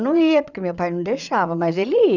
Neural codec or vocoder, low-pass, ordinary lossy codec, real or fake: vocoder, 22.05 kHz, 80 mel bands, Vocos; 7.2 kHz; none; fake